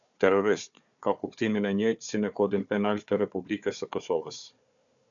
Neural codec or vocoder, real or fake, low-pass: codec, 16 kHz, 4 kbps, FunCodec, trained on Chinese and English, 50 frames a second; fake; 7.2 kHz